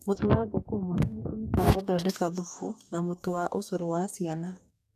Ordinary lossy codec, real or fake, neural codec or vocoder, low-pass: none; fake; codec, 44.1 kHz, 2.6 kbps, DAC; 14.4 kHz